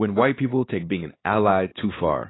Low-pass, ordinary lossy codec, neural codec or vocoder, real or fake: 7.2 kHz; AAC, 16 kbps; codec, 16 kHz, 4 kbps, X-Codec, WavLM features, trained on Multilingual LibriSpeech; fake